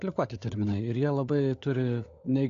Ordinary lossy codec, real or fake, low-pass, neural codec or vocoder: AAC, 48 kbps; fake; 7.2 kHz; codec, 16 kHz, 16 kbps, FunCodec, trained on LibriTTS, 50 frames a second